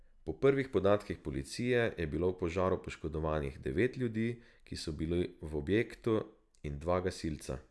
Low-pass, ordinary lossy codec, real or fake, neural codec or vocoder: none; none; real; none